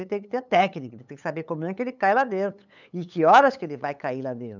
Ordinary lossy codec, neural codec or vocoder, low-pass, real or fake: none; codec, 16 kHz, 8 kbps, FunCodec, trained on LibriTTS, 25 frames a second; 7.2 kHz; fake